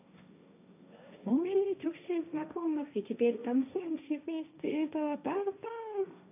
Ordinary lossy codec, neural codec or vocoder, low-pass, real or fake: AAC, 24 kbps; codec, 16 kHz, 1.1 kbps, Voila-Tokenizer; 3.6 kHz; fake